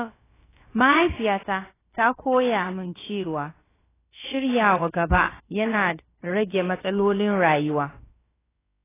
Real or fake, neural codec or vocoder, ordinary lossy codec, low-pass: fake; codec, 16 kHz, about 1 kbps, DyCAST, with the encoder's durations; AAC, 16 kbps; 3.6 kHz